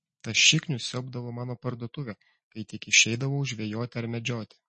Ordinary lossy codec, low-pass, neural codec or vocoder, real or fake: MP3, 32 kbps; 9.9 kHz; none; real